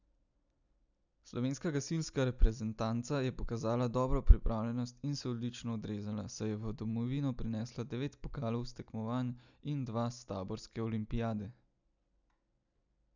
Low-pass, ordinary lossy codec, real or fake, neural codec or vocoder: 7.2 kHz; none; real; none